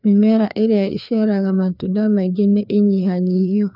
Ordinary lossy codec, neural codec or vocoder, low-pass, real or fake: none; codec, 16 kHz, 2 kbps, FreqCodec, larger model; 5.4 kHz; fake